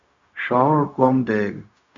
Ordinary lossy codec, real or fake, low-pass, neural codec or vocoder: Opus, 64 kbps; fake; 7.2 kHz; codec, 16 kHz, 0.4 kbps, LongCat-Audio-Codec